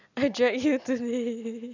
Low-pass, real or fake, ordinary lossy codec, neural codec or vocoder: 7.2 kHz; real; none; none